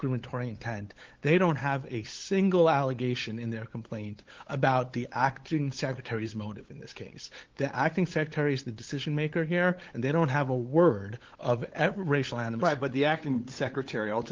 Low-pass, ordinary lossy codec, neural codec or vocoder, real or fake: 7.2 kHz; Opus, 16 kbps; codec, 16 kHz, 8 kbps, FunCodec, trained on LibriTTS, 25 frames a second; fake